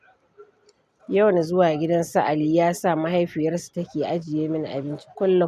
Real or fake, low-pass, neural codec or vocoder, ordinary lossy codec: real; 14.4 kHz; none; none